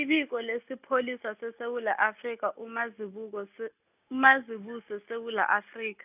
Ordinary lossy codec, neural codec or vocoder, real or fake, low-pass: none; none; real; 3.6 kHz